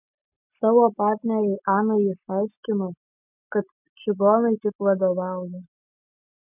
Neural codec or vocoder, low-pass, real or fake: none; 3.6 kHz; real